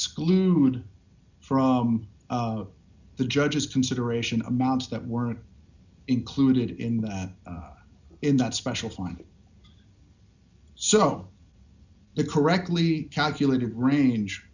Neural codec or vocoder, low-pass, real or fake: none; 7.2 kHz; real